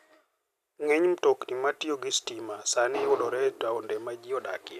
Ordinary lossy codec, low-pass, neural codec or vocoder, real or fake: none; 14.4 kHz; none; real